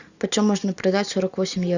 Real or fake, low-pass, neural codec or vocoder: real; 7.2 kHz; none